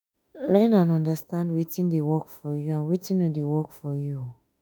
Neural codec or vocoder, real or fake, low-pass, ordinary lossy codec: autoencoder, 48 kHz, 32 numbers a frame, DAC-VAE, trained on Japanese speech; fake; none; none